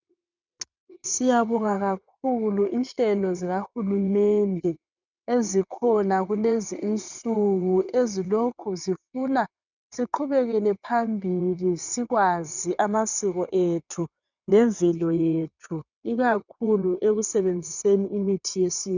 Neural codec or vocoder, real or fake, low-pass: vocoder, 22.05 kHz, 80 mel bands, WaveNeXt; fake; 7.2 kHz